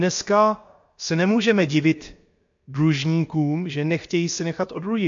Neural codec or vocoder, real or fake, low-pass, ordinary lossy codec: codec, 16 kHz, about 1 kbps, DyCAST, with the encoder's durations; fake; 7.2 kHz; MP3, 48 kbps